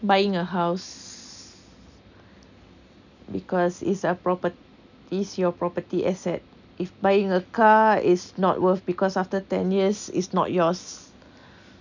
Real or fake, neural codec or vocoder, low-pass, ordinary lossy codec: real; none; 7.2 kHz; none